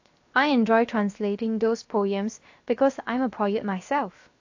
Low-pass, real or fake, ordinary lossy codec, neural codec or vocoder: 7.2 kHz; fake; AAC, 48 kbps; codec, 16 kHz, 0.7 kbps, FocalCodec